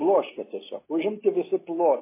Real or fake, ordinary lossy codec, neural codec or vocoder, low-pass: real; MP3, 16 kbps; none; 3.6 kHz